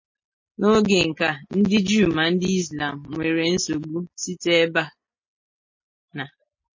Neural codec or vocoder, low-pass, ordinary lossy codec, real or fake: none; 7.2 kHz; MP3, 32 kbps; real